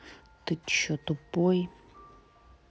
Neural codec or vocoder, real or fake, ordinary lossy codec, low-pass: none; real; none; none